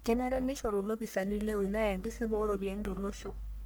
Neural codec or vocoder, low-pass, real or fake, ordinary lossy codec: codec, 44.1 kHz, 1.7 kbps, Pupu-Codec; none; fake; none